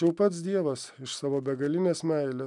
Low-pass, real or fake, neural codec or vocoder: 10.8 kHz; real; none